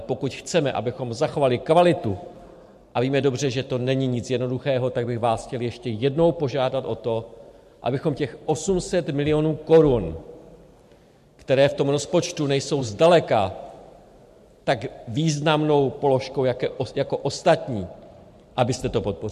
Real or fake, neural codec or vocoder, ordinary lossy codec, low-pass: fake; vocoder, 44.1 kHz, 128 mel bands every 512 samples, BigVGAN v2; MP3, 64 kbps; 14.4 kHz